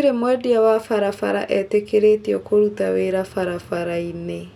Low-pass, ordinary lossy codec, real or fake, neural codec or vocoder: 19.8 kHz; none; real; none